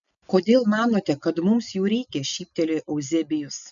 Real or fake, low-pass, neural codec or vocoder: real; 7.2 kHz; none